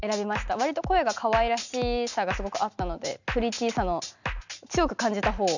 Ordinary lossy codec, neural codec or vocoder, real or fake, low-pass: none; none; real; 7.2 kHz